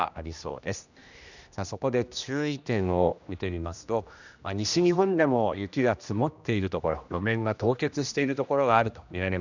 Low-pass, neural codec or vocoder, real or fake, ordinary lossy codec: 7.2 kHz; codec, 16 kHz, 1 kbps, X-Codec, HuBERT features, trained on general audio; fake; none